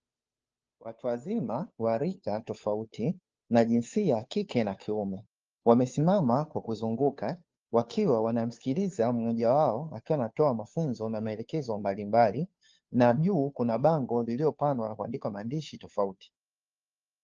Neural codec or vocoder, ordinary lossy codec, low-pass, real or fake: codec, 16 kHz, 2 kbps, FunCodec, trained on Chinese and English, 25 frames a second; Opus, 24 kbps; 7.2 kHz; fake